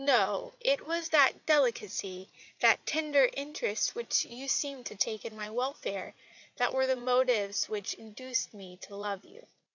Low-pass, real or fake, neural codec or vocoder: 7.2 kHz; fake; vocoder, 44.1 kHz, 80 mel bands, Vocos